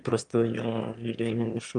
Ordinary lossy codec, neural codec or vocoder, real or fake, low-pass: Opus, 32 kbps; autoencoder, 22.05 kHz, a latent of 192 numbers a frame, VITS, trained on one speaker; fake; 9.9 kHz